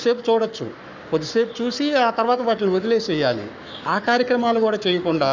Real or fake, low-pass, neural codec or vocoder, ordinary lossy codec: fake; 7.2 kHz; codec, 44.1 kHz, 7.8 kbps, Pupu-Codec; none